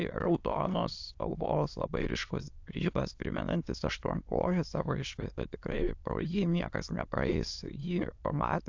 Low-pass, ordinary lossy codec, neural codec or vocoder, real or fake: 7.2 kHz; AAC, 48 kbps; autoencoder, 22.05 kHz, a latent of 192 numbers a frame, VITS, trained on many speakers; fake